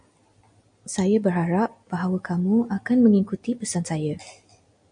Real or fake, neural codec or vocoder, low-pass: real; none; 9.9 kHz